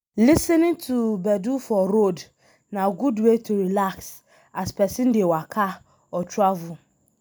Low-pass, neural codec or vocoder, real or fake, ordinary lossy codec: none; none; real; none